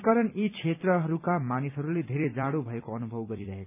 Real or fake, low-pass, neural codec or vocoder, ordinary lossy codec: fake; 3.6 kHz; vocoder, 44.1 kHz, 128 mel bands every 256 samples, BigVGAN v2; none